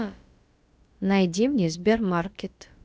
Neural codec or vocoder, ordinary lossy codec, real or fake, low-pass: codec, 16 kHz, about 1 kbps, DyCAST, with the encoder's durations; none; fake; none